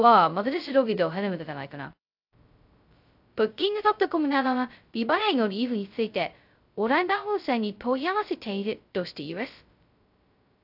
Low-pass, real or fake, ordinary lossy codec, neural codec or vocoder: 5.4 kHz; fake; AAC, 48 kbps; codec, 16 kHz, 0.2 kbps, FocalCodec